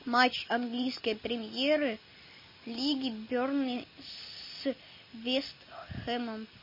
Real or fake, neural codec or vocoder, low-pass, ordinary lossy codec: real; none; 5.4 kHz; MP3, 24 kbps